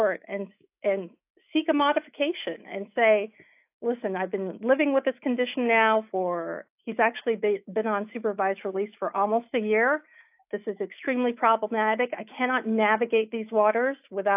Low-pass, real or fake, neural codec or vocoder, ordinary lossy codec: 3.6 kHz; fake; autoencoder, 48 kHz, 128 numbers a frame, DAC-VAE, trained on Japanese speech; AAC, 32 kbps